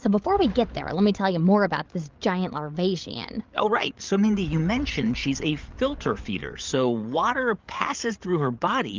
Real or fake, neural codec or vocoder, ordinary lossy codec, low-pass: fake; codec, 16 kHz, 8 kbps, FreqCodec, larger model; Opus, 32 kbps; 7.2 kHz